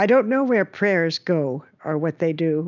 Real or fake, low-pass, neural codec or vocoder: real; 7.2 kHz; none